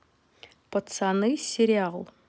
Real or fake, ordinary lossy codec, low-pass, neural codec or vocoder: real; none; none; none